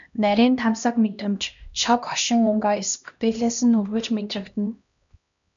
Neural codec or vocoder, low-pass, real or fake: codec, 16 kHz, 1 kbps, X-Codec, HuBERT features, trained on LibriSpeech; 7.2 kHz; fake